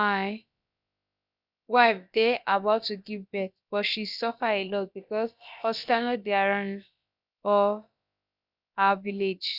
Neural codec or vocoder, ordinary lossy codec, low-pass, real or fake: codec, 16 kHz, about 1 kbps, DyCAST, with the encoder's durations; none; 5.4 kHz; fake